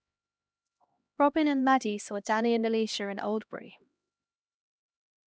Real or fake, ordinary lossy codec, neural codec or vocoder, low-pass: fake; none; codec, 16 kHz, 1 kbps, X-Codec, HuBERT features, trained on LibriSpeech; none